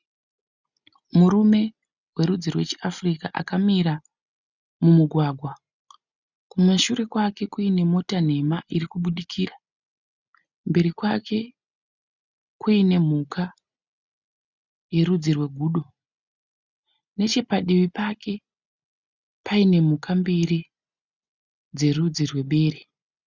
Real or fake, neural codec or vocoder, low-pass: real; none; 7.2 kHz